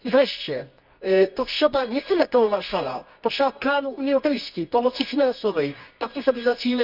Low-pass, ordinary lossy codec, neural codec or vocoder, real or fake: 5.4 kHz; none; codec, 24 kHz, 0.9 kbps, WavTokenizer, medium music audio release; fake